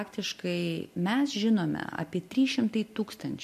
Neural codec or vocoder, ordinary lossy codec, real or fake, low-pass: none; MP3, 64 kbps; real; 14.4 kHz